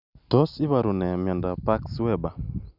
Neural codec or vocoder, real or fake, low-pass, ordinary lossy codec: none; real; 5.4 kHz; none